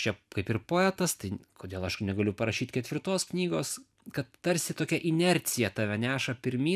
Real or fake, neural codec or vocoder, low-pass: fake; vocoder, 44.1 kHz, 128 mel bands every 256 samples, BigVGAN v2; 14.4 kHz